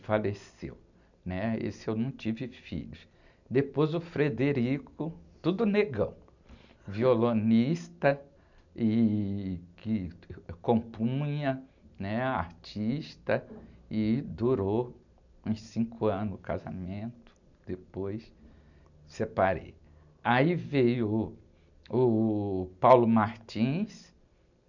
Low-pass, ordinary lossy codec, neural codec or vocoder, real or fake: 7.2 kHz; none; none; real